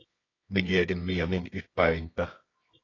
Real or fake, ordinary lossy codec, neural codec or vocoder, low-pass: fake; AAC, 32 kbps; codec, 24 kHz, 0.9 kbps, WavTokenizer, medium music audio release; 7.2 kHz